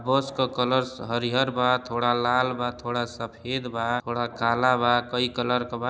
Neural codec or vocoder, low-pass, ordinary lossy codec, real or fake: none; none; none; real